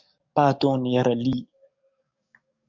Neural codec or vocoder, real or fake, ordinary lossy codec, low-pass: codec, 44.1 kHz, 7.8 kbps, DAC; fake; MP3, 64 kbps; 7.2 kHz